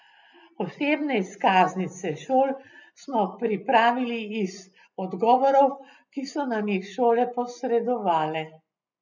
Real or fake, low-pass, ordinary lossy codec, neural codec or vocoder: real; 7.2 kHz; none; none